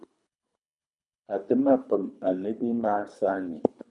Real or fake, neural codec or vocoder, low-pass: fake; codec, 24 kHz, 3 kbps, HILCodec; 10.8 kHz